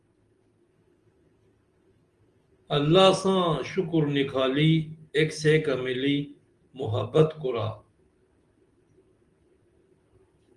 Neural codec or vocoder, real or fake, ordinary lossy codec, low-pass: none; real; Opus, 24 kbps; 10.8 kHz